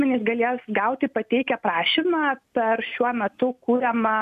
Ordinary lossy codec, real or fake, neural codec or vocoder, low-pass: MP3, 96 kbps; real; none; 14.4 kHz